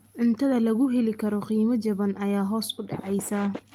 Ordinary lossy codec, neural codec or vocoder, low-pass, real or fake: Opus, 32 kbps; none; 19.8 kHz; real